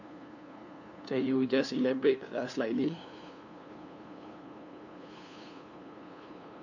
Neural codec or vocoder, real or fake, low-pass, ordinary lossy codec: codec, 16 kHz, 2 kbps, FunCodec, trained on LibriTTS, 25 frames a second; fake; 7.2 kHz; none